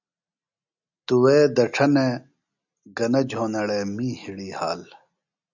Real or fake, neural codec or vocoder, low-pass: real; none; 7.2 kHz